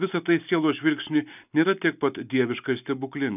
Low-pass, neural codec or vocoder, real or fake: 3.6 kHz; none; real